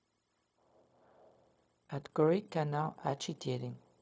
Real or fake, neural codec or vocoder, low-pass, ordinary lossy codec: fake; codec, 16 kHz, 0.4 kbps, LongCat-Audio-Codec; none; none